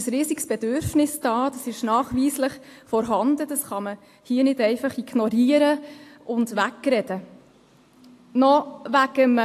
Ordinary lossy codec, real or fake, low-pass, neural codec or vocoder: AAC, 64 kbps; real; 14.4 kHz; none